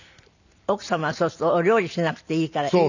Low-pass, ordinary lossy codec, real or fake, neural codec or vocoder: 7.2 kHz; none; real; none